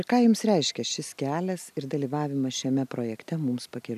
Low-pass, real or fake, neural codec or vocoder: 14.4 kHz; real; none